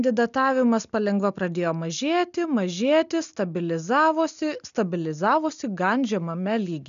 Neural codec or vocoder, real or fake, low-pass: none; real; 7.2 kHz